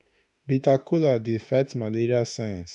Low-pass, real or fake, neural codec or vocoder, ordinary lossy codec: 10.8 kHz; fake; autoencoder, 48 kHz, 32 numbers a frame, DAC-VAE, trained on Japanese speech; none